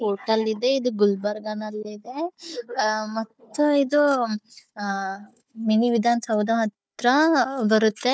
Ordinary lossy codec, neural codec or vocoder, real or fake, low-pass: none; codec, 16 kHz, 4 kbps, FunCodec, trained on Chinese and English, 50 frames a second; fake; none